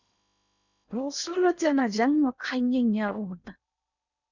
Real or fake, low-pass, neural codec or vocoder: fake; 7.2 kHz; codec, 16 kHz in and 24 kHz out, 0.8 kbps, FocalCodec, streaming, 65536 codes